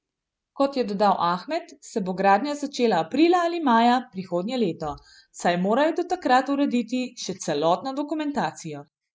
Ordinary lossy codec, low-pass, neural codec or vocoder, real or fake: none; none; none; real